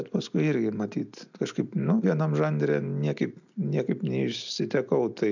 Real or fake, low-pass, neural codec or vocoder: real; 7.2 kHz; none